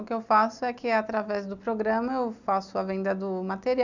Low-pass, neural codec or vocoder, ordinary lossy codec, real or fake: 7.2 kHz; none; none; real